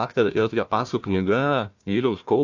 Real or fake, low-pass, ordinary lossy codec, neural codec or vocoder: fake; 7.2 kHz; AAC, 48 kbps; codec, 16 kHz, 1 kbps, FunCodec, trained on Chinese and English, 50 frames a second